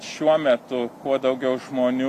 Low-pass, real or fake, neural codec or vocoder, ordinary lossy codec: 14.4 kHz; real; none; Opus, 64 kbps